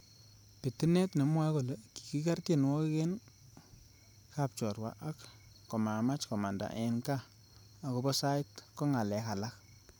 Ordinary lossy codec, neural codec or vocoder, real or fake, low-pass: none; vocoder, 44.1 kHz, 128 mel bands every 512 samples, BigVGAN v2; fake; none